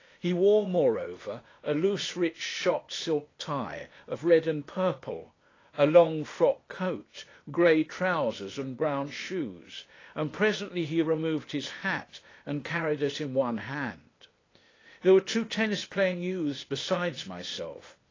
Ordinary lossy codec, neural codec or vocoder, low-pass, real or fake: AAC, 32 kbps; codec, 16 kHz, 0.8 kbps, ZipCodec; 7.2 kHz; fake